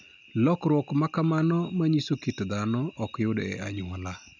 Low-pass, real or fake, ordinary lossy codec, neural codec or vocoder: 7.2 kHz; real; none; none